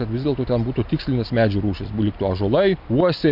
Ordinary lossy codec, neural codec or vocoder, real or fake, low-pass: MP3, 48 kbps; none; real; 5.4 kHz